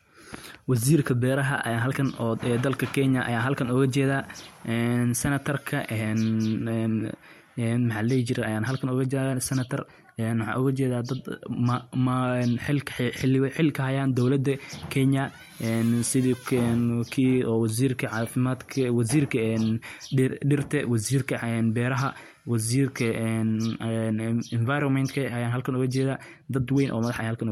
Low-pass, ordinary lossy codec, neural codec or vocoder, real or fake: 19.8 kHz; MP3, 64 kbps; none; real